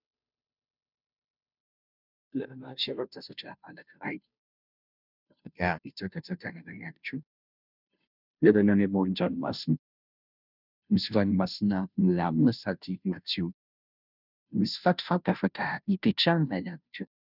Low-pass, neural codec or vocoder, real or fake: 5.4 kHz; codec, 16 kHz, 0.5 kbps, FunCodec, trained on Chinese and English, 25 frames a second; fake